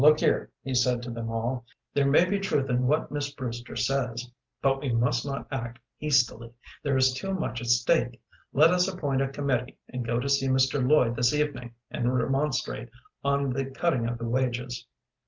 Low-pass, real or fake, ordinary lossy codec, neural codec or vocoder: 7.2 kHz; real; Opus, 32 kbps; none